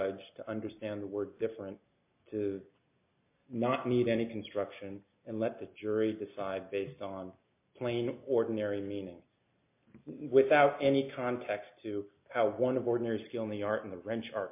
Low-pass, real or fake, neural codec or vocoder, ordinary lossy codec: 3.6 kHz; real; none; MP3, 32 kbps